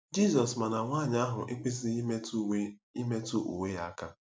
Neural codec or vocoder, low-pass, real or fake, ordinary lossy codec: none; none; real; none